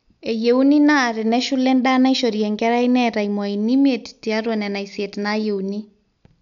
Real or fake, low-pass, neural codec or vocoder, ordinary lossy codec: real; 7.2 kHz; none; none